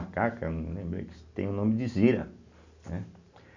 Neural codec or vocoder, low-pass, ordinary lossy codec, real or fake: none; 7.2 kHz; AAC, 48 kbps; real